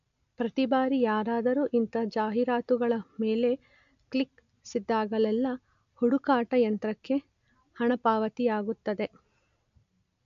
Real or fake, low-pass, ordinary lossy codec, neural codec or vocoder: real; 7.2 kHz; none; none